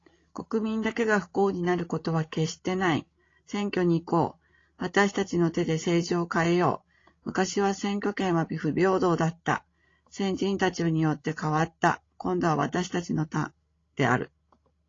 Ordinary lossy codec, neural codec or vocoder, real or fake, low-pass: AAC, 32 kbps; codec, 16 kHz, 16 kbps, FreqCodec, larger model; fake; 7.2 kHz